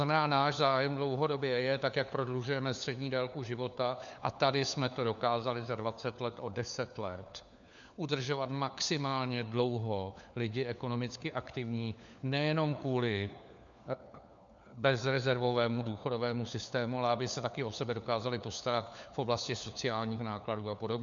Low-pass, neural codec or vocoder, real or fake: 7.2 kHz; codec, 16 kHz, 4 kbps, FunCodec, trained on LibriTTS, 50 frames a second; fake